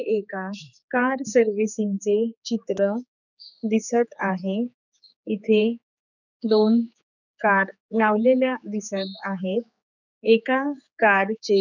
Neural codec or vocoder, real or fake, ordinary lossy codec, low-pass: codec, 16 kHz, 4 kbps, X-Codec, HuBERT features, trained on general audio; fake; none; 7.2 kHz